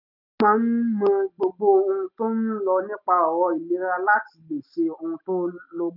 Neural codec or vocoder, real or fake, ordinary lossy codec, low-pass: none; real; none; 5.4 kHz